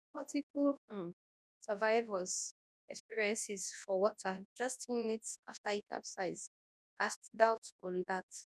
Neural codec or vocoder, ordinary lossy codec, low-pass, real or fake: codec, 24 kHz, 0.9 kbps, WavTokenizer, large speech release; none; none; fake